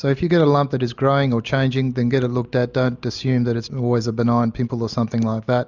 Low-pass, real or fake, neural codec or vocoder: 7.2 kHz; real; none